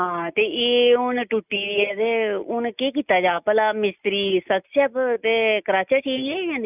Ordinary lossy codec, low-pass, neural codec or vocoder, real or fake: none; 3.6 kHz; none; real